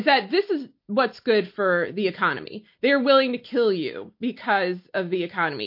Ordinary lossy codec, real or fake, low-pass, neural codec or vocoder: MP3, 32 kbps; real; 5.4 kHz; none